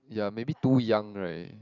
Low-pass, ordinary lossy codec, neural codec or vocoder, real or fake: 7.2 kHz; none; vocoder, 44.1 kHz, 128 mel bands every 512 samples, BigVGAN v2; fake